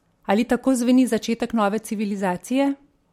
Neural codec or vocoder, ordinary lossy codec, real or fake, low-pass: none; MP3, 64 kbps; real; 19.8 kHz